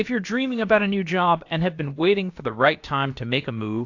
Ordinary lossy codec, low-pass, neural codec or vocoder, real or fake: AAC, 48 kbps; 7.2 kHz; codec, 16 kHz, about 1 kbps, DyCAST, with the encoder's durations; fake